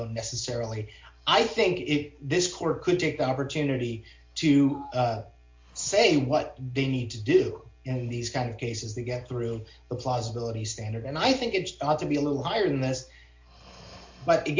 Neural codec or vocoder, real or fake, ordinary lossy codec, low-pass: none; real; MP3, 48 kbps; 7.2 kHz